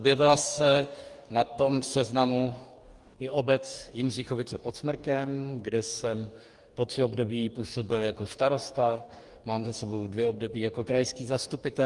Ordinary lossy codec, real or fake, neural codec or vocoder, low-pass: Opus, 32 kbps; fake; codec, 44.1 kHz, 2.6 kbps, DAC; 10.8 kHz